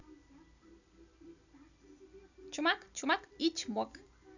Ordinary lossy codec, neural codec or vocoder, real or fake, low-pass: none; none; real; 7.2 kHz